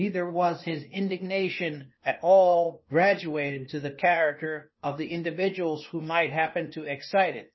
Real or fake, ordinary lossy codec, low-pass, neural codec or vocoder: fake; MP3, 24 kbps; 7.2 kHz; codec, 16 kHz, 0.8 kbps, ZipCodec